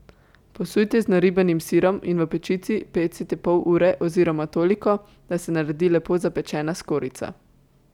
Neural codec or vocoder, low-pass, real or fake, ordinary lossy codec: none; 19.8 kHz; real; none